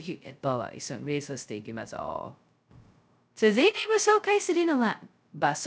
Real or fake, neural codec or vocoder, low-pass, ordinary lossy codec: fake; codec, 16 kHz, 0.2 kbps, FocalCodec; none; none